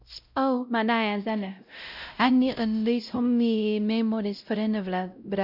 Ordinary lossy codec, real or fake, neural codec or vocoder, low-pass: none; fake; codec, 16 kHz, 0.5 kbps, X-Codec, WavLM features, trained on Multilingual LibriSpeech; 5.4 kHz